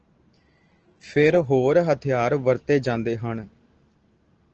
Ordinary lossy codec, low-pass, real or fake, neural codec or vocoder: Opus, 16 kbps; 7.2 kHz; real; none